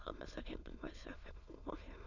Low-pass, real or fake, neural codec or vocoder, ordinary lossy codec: 7.2 kHz; fake; autoencoder, 22.05 kHz, a latent of 192 numbers a frame, VITS, trained on many speakers; none